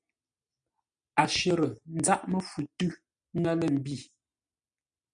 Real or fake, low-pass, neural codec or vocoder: real; 9.9 kHz; none